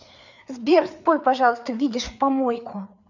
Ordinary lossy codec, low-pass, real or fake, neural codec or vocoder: none; 7.2 kHz; fake; codec, 16 kHz, 4 kbps, FreqCodec, larger model